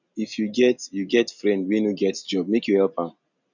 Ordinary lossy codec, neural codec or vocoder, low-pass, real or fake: none; none; 7.2 kHz; real